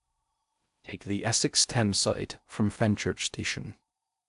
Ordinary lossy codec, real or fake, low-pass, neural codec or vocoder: AAC, 96 kbps; fake; 10.8 kHz; codec, 16 kHz in and 24 kHz out, 0.6 kbps, FocalCodec, streaming, 4096 codes